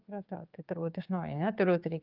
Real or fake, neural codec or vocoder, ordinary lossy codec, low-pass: fake; codec, 16 kHz, 6 kbps, DAC; Opus, 32 kbps; 5.4 kHz